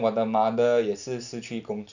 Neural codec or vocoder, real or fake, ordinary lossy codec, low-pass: none; real; none; 7.2 kHz